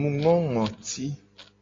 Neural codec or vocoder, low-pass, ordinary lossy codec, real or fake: none; 7.2 kHz; AAC, 32 kbps; real